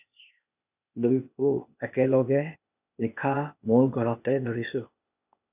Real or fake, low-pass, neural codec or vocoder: fake; 3.6 kHz; codec, 16 kHz, 0.8 kbps, ZipCodec